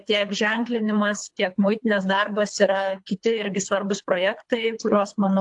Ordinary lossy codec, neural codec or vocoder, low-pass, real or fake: AAC, 64 kbps; codec, 24 kHz, 3 kbps, HILCodec; 10.8 kHz; fake